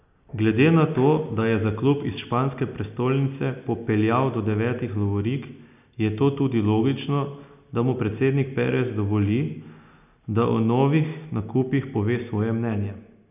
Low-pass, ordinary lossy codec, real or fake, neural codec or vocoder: 3.6 kHz; none; real; none